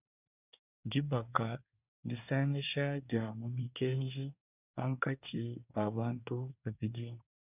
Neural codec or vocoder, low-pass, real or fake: codec, 24 kHz, 1 kbps, SNAC; 3.6 kHz; fake